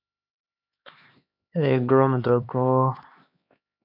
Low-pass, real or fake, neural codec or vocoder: 5.4 kHz; fake; codec, 16 kHz, 2 kbps, X-Codec, HuBERT features, trained on LibriSpeech